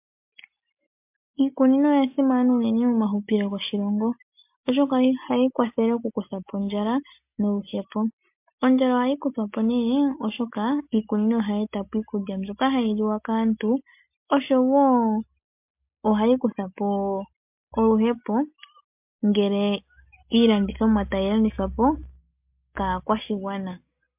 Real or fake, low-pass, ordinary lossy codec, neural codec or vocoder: real; 3.6 kHz; MP3, 32 kbps; none